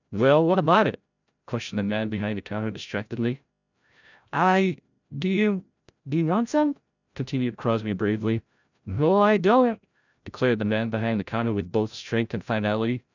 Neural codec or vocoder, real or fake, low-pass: codec, 16 kHz, 0.5 kbps, FreqCodec, larger model; fake; 7.2 kHz